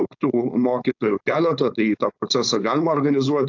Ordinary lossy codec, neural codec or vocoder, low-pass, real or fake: AAC, 48 kbps; codec, 16 kHz, 4.8 kbps, FACodec; 7.2 kHz; fake